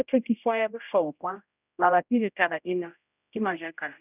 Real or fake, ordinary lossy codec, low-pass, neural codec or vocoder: fake; none; 3.6 kHz; codec, 16 kHz, 0.5 kbps, X-Codec, HuBERT features, trained on general audio